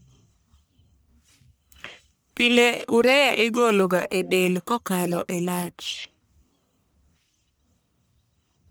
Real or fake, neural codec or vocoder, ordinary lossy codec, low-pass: fake; codec, 44.1 kHz, 1.7 kbps, Pupu-Codec; none; none